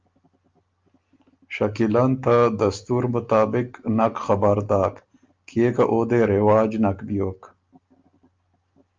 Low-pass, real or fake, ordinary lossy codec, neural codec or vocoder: 7.2 kHz; real; Opus, 24 kbps; none